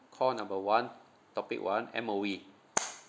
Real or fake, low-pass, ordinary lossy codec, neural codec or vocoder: real; none; none; none